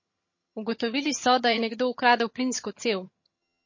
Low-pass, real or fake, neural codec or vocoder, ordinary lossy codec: 7.2 kHz; fake; vocoder, 22.05 kHz, 80 mel bands, HiFi-GAN; MP3, 32 kbps